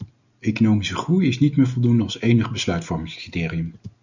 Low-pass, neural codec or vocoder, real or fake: 7.2 kHz; none; real